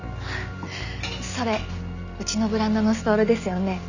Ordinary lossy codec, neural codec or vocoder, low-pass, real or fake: none; none; 7.2 kHz; real